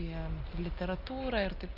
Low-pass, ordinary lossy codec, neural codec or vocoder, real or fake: 5.4 kHz; Opus, 32 kbps; none; real